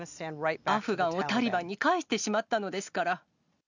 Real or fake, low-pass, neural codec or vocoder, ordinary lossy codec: real; 7.2 kHz; none; none